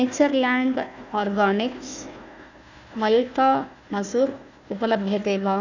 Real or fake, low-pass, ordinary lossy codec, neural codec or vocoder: fake; 7.2 kHz; none; codec, 16 kHz, 1 kbps, FunCodec, trained on Chinese and English, 50 frames a second